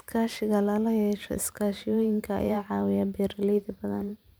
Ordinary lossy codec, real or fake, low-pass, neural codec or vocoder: none; fake; none; vocoder, 44.1 kHz, 128 mel bands every 512 samples, BigVGAN v2